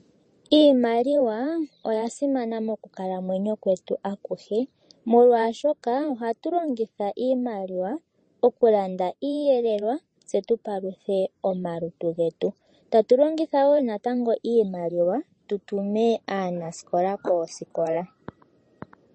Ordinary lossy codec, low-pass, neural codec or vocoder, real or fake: MP3, 32 kbps; 10.8 kHz; vocoder, 44.1 kHz, 128 mel bands every 512 samples, BigVGAN v2; fake